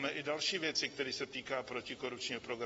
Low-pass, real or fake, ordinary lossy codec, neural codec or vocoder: 19.8 kHz; real; AAC, 24 kbps; none